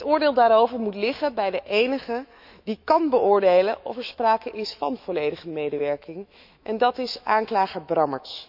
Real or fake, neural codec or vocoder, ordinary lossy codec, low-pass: fake; autoencoder, 48 kHz, 128 numbers a frame, DAC-VAE, trained on Japanese speech; none; 5.4 kHz